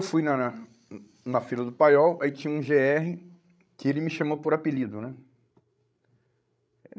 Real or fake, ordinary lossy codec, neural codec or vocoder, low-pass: fake; none; codec, 16 kHz, 16 kbps, FreqCodec, larger model; none